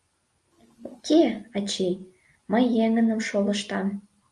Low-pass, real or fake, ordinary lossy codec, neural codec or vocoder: 10.8 kHz; real; Opus, 32 kbps; none